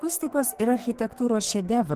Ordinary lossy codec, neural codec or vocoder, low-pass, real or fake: Opus, 16 kbps; codec, 44.1 kHz, 2.6 kbps, SNAC; 14.4 kHz; fake